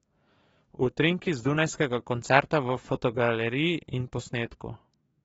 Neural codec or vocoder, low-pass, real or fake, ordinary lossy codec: codec, 44.1 kHz, 7.8 kbps, DAC; 19.8 kHz; fake; AAC, 24 kbps